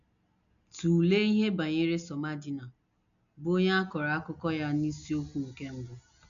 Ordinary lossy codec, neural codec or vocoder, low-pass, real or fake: none; none; 7.2 kHz; real